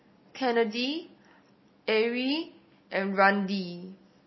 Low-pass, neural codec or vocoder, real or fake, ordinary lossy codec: 7.2 kHz; none; real; MP3, 24 kbps